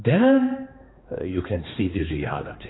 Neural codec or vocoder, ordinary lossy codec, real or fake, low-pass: codec, 16 kHz, 2 kbps, X-Codec, HuBERT features, trained on balanced general audio; AAC, 16 kbps; fake; 7.2 kHz